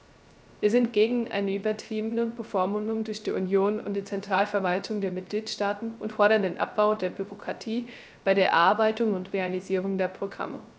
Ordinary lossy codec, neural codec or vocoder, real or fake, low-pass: none; codec, 16 kHz, 0.3 kbps, FocalCodec; fake; none